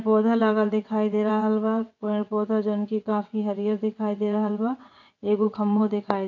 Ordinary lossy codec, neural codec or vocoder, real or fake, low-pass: none; vocoder, 22.05 kHz, 80 mel bands, WaveNeXt; fake; 7.2 kHz